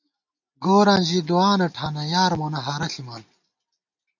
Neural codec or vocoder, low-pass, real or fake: none; 7.2 kHz; real